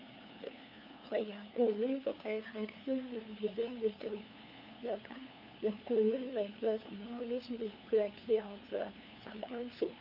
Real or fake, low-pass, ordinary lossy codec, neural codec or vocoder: fake; 5.4 kHz; none; codec, 16 kHz, 2 kbps, FunCodec, trained on LibriTTS, 25 frames a second